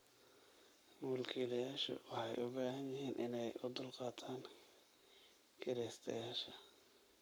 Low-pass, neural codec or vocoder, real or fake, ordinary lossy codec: none; codec, 44.1 kHz, 7.8 kbps, Pupu-Codec; fake; none